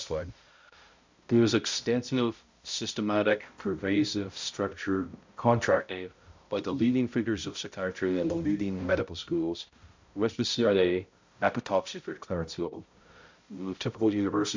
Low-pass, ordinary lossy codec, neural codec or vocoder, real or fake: 7.2 kHz; MP3, 64 kbps; codec, 16 kHz, 0.5 kbps, X-Codec, HuBERT features, trained on balanced general audio; fake